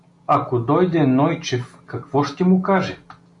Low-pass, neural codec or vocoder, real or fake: 10.8 kHz; none; real